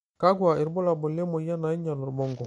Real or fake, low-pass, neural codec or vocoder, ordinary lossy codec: real; 19.8 kHz; none; MP3, 48 kbps